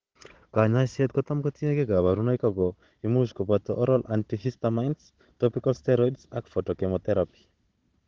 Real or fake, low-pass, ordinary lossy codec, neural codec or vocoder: fake; 7.2 kHz; Opus, 16 kbps; codec, 16 kHz, 16 kbps, FunCodec, trained on Chinese and English, 50 frames a second